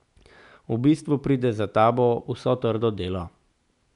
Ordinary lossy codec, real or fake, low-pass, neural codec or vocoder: none; real; 10.8 kHz; none